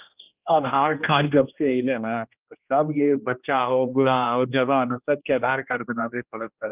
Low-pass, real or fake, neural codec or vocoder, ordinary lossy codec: 3.6 kHz; fake; codec, 16 kHz, 1 kbps, X-Codec, HuBERT features, trained on general audio; Opus, 24 kbps